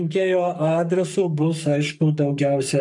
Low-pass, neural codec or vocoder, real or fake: 10.8 kHz; codec, 44.1 kHz, 3.4 kbps, Pupu-Codec; fake